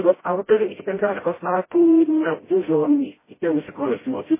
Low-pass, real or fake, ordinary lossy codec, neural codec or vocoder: 3.6 kHz; fake; MP3, 16 kbps; codec, 16 kHz, 0.5 kbps, FreqCodec, smaller model